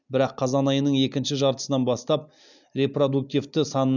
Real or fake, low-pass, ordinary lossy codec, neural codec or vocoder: real; 7.2 kHz; none; none